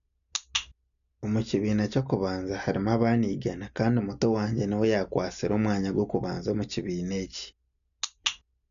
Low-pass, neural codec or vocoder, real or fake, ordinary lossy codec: 7.2 kHz; none; real; none